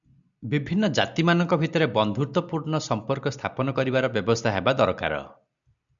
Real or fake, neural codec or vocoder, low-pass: real; none; 7.2 kHz